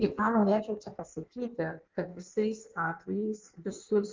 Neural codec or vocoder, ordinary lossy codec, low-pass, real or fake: codec, 16 kHz in and 24 kHz out, 1.1 kbps, FireRedTTS-2 codec; Opus, 24 kbps; 7.2 kHz; fake